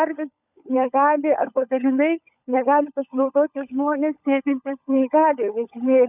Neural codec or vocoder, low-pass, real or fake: codec, 16 kHz, 16 kbps, FunCodec, trained on LibriTTS, 50 frames a second; 3.6 kHz; fake